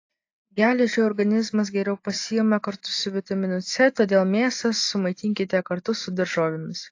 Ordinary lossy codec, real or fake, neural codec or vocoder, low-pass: AAC, 48 kbps; real; none; 7.2 kHz